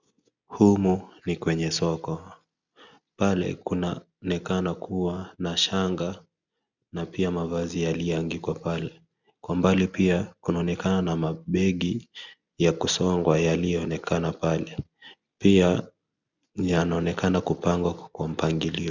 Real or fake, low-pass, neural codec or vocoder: real; 7.2 kHz; none